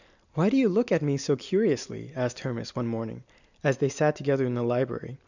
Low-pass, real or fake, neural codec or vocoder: 7.2 kHz; real; none